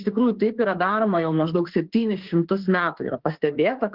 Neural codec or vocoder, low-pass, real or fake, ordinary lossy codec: autoencoder, 48 kHz, 32 numbers a frame, DAC-VAE, trained on Japanese speech; 5.4 kHz; fake; Opus, 16 kbps